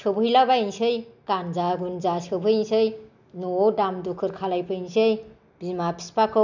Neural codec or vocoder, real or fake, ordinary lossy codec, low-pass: none; real; none; 7.2 kHz